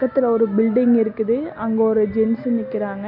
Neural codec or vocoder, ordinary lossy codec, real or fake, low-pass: none; none; real; 5.4 kHz